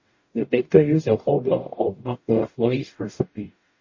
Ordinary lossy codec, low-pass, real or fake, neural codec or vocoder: MP3, 32 kbps; 7.2 kHz; fake; codec, 44.1 kHz, 0.9 kbps, DAC